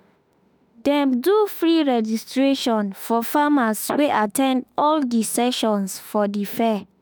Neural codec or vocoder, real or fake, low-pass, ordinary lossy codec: autoencoder, 48 kHz, 32 numbers a frame, DAC-VAE, trained on Japanese speech; fake; none; none